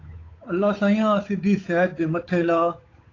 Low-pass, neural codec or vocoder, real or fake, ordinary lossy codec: 7.2 kHz; codec, 16 kHz, 8 kbps, FunCodec, trained on Chinese and English, 25 frames a second; fake; AAC, 32 kbps